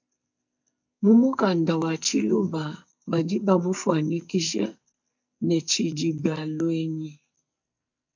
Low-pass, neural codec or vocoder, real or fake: 7.2 kHz; codec, 44.1 kHz, 2.6 kbps, SNAC; fake